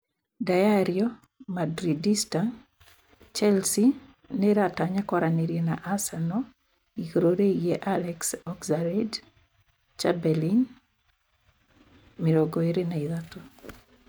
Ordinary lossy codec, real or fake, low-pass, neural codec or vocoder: none; real; none; none